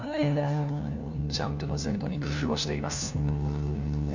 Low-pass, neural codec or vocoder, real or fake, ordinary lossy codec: 7.2 kHz; codec, 16 kHz, 1 kbps, FunCodec, trained on LibriTTS, 50 frames a second; fake; none